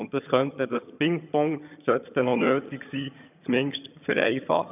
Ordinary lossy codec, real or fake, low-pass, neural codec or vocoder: none; fake; 3.6 kHz; vocoder, 22.05 kHz, 80 mel bands, HiFi-GAN